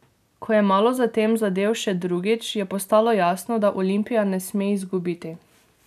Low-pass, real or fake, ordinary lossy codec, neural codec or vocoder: 14.4 kHz; real; none; none